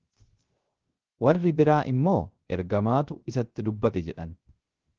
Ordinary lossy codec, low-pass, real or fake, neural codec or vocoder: Opus, 16 kbps; 7.2 kHz; fake; codec, 16 kHz, 0.3 kbps, FocalCodec